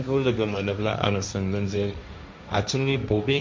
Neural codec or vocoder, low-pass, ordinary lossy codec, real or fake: codec, 16 kHz, 1.1 kbps, Voila-Tokenizer; none; none; fake